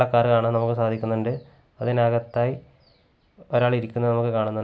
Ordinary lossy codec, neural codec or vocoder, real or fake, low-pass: none; none; real; none